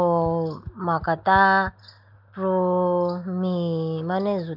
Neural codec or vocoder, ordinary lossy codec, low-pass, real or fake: none; Opus, 24 kbps; 5.4 kHz; real